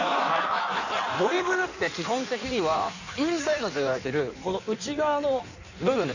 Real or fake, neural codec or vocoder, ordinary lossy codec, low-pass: fake; codec, 16 kHz in and 24 kHz out, 1.1 kbps, FireRedTTS-2 codec; none; 7.2 kHz